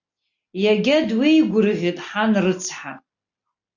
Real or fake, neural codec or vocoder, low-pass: real; none; 7.2 kHz